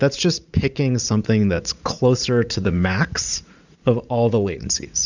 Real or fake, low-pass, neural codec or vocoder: fake; 7.2 kHz; vocoder, 44.1 kHz, 80 mel bands, Vocos